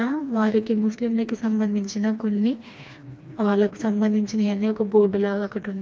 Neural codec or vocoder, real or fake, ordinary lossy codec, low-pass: codec, 16 kHz, 2 kbps, FreqCodec, smaller model; fake; none; none